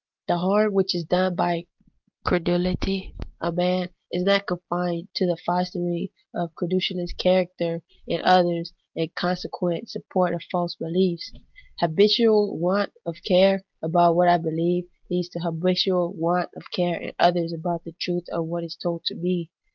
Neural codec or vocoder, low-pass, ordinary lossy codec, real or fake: none; 7.2 kHz; Opus, 24 kbps; real